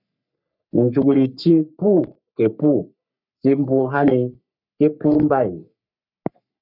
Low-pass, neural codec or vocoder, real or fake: 5.4 kHz; codec, 44.1 kHz, 3.4 kbps, Pupu-Codec; fake